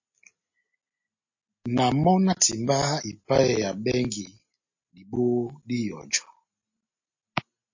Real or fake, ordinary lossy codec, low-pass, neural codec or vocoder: real; MP3, 32 kbps; 7.2 kHz; none